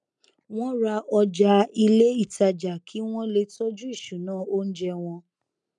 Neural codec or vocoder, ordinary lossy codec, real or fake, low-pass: none; none; real; 10.8 kHz